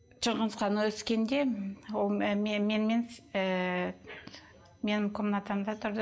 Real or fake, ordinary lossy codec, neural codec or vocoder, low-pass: real; none; none; none